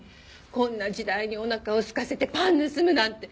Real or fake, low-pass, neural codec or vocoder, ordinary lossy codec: real; none; none; none